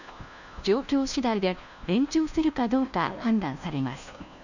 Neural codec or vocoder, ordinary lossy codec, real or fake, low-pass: codec, 16 kHz, 1 kbps, FunCodec, trained on LibriTTS, 50 frames a second; none; fake; 7.2 kHz